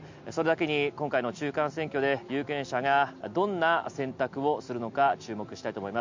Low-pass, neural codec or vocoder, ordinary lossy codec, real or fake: 7.2 kHz; none; MP3, 64 kbps; real